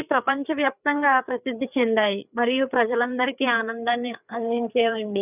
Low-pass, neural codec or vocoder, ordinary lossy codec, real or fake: 3.6 kHz; codec, 16 kHz, 4 kbps, FreqCodec, larger model; none; fake